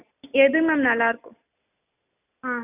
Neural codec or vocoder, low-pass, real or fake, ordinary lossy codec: none; 3.6 kHz; real; none